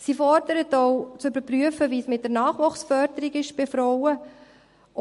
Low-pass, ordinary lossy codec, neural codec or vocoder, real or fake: 14.4 kHz; MP3, 48 kbps; none; real